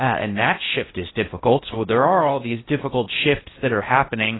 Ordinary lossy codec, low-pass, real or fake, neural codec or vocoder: AAC, 16 kbps; 7.2 kHz; fake; codec, 16 kHz in and 24 kHz out, 0.6 kbps, FocalCodec, streaming, 4096 codes